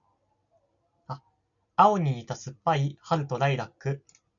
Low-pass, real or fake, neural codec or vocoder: 7.2 kHz; real; none